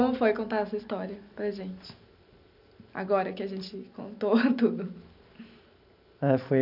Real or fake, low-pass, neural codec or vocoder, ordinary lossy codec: real; 5.4 kHz; none; none